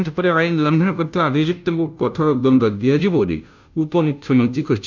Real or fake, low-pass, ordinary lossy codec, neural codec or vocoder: fake; 7.2 kHz; none; codec, 16 kHz, 0.5 kbps, FunCodec, trained on Chinese and English, 25 frames a second